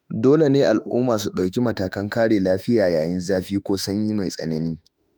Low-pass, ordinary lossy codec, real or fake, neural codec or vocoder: none; none; fake; autoencoder, 48 kHz, 32 numbers a frame, DAC-VAE, trained on Japanese speech